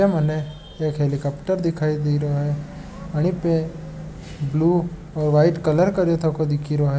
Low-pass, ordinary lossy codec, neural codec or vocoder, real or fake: none; none; none; real